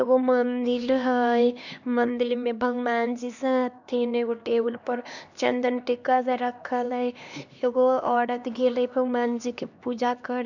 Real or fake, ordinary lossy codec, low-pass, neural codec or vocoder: fake; none; 7.2 kHz; codec, 16 kHz, 2 kbps, X-Codec, HuBERT features, trained on LibriSpeech